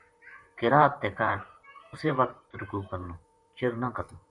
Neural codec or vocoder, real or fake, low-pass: vocoder, 44.1 kHz, 128 mel bands, Pupu-Vocoder; fake; 10.8 kHz